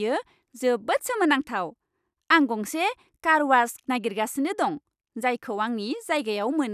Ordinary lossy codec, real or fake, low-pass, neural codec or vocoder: none; real; 14.4 kHz; none